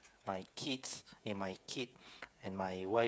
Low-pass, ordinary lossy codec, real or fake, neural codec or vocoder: none; none; fake; codec, 16 kHz, 8 kbps, FreqCodec, smaller model